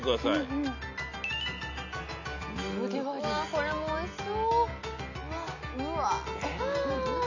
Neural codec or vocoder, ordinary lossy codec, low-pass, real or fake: none; none; 7.2 kHz; real